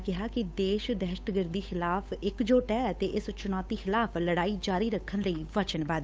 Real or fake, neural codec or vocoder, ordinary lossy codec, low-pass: fake; codec, 16 kHz, 8 kbps, FunCodec, trained on Chinese and English, 25 frames a second; none; none